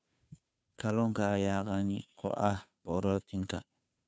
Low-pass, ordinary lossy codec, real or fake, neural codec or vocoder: none; none; fake; codec, 16 kHz, 2 kbps, FunCodec, trained on Chinese and English, 25 frames a second